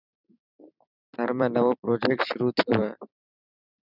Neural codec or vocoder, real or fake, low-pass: vocoder, 44.1 kHz, 128 mel bands every 256 samples, BigVGAN v2; fake; 5.4 kHz